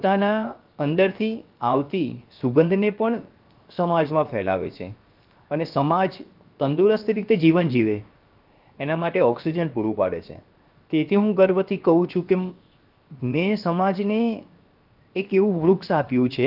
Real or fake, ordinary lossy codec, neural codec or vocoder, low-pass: fake; Opus, 24 kbps; codec, 16 kHz, 0.7 kbps, FocalCodec; 5.4 kHz